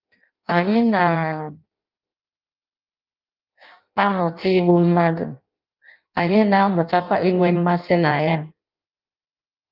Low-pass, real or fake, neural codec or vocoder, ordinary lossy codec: 5.4 kHz; fake; codec, 16 kHz in and 24 kHz out, 0.6 kbps, FireRedTTS-2 codec; Opus, 24 kbps